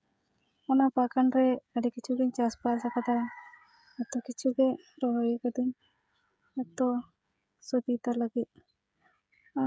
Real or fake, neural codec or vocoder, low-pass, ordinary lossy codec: fake; codec, 16 kHz, 16 kbps, FreqCodec, smaller model; none; none